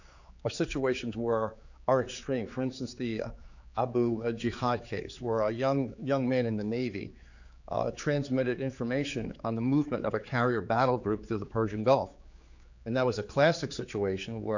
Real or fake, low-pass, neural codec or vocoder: fake; 7.2 kHz; codec, 16 kHz, 4 kbps, X-Codec, HuBERT features, trained on general audio